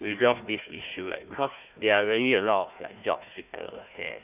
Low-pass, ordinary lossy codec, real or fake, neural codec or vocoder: 3.6 kHz; none; fake; codec, 16 kHz, 1 kbps, FunCodec, trained on Chinese and English, 50 frames a second